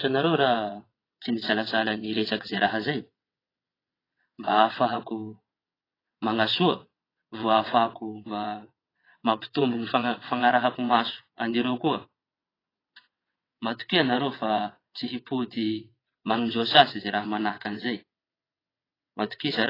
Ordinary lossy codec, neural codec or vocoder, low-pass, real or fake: AAC, 24 kbps; vocoder, 22.05 kHz, 80 mel bands, WaveNeXt; 5.4 kHz; fake